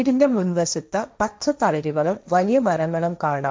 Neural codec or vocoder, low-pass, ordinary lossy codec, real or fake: codec, 16 kHz, 1.1 kbps, Voila-Tokenizer; none; none; fake